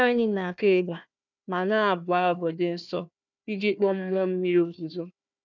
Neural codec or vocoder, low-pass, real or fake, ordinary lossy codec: codec, 16 kHz, 1 kbps, FunCodec, trained on Chinese and English, 50 frames a second; 7.2 kHz; fake; none